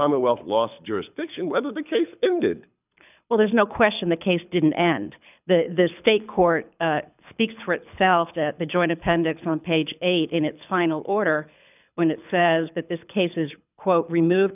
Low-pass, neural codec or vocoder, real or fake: 3.6 kHz; codec, 24 kHz, 6 kbps, HILCodec; fake